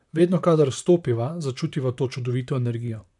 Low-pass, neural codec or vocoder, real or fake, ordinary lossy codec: 10.8 kHz; vocoder, 44.1 kHz, 128 mel bands, Pupu-Vocoder; fake; none